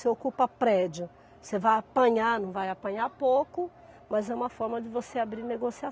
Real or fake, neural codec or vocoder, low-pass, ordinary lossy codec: real; none; none; none